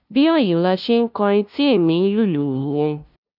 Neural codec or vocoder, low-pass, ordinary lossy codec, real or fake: codec, 16 kHz, 0.5 kbps, FunCodec, trained on LibriTTS, 25 frames a second; 5.4 kHz; none; fake